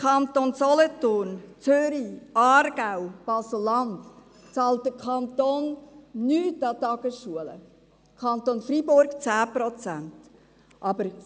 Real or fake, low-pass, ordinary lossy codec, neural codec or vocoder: real; none; none; none